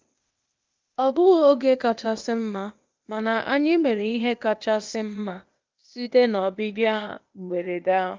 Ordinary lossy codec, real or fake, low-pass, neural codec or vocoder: Opus, 24 kbps; fake; 7.2 kHz; codec, 16 kHz, 0.8 kbps, ZipCodec